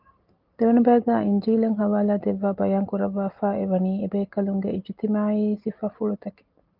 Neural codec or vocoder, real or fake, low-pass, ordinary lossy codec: none; real; 5.4 kHz; Opus, 32 kbps